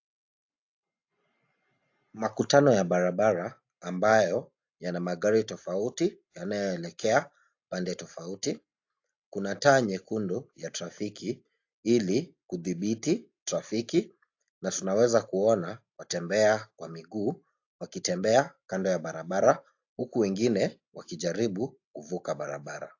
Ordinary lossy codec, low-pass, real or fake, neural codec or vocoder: AAC, 48 kbps; 7.2 kHz; real; none